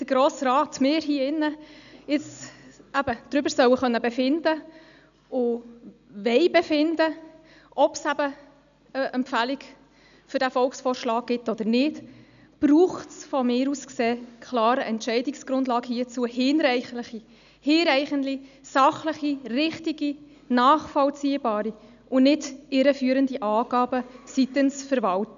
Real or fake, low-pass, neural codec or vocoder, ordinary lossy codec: real; 7.2 kHz; none; none